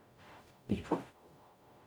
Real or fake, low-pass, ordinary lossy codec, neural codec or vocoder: fake; none; none; codec, 44.1 kHz, 0.9 kbps, DAC